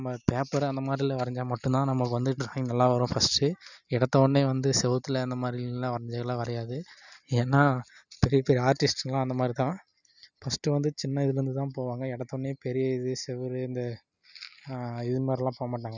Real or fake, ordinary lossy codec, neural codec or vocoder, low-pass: real; none; none; 7.2 kHz